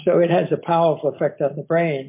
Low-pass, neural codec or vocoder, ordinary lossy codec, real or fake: 3.6 kHz; none; MP3, 24 kbps; real